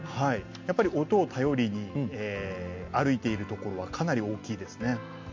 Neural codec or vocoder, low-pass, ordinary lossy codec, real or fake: none; 7.2 kHz; MP3, 48 kbps; real